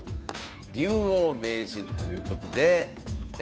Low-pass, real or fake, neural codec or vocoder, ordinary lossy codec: none; fake; codec, 16 kHz, 2 kbps, FunCodec, trained on Chinese and English, 25 frames a second; none